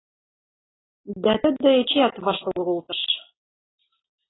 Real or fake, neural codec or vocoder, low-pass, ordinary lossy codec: real; none; 7.2 kHz; AAC, 16 kbps